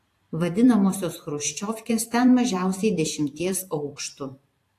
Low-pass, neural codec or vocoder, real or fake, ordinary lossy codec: 14.4 kHz; vocoder, 44.1 kHz, 128 mel bands every 512 samples, BigVGAN v2; fake; AAC, 64 kbps